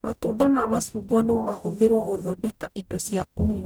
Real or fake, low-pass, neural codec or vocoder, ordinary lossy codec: fake; none; codec, 44.1 kHz, 0.9 kbps, DAC; none